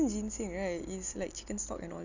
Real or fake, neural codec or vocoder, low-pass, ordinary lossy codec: real; none; 7.2 kHz; none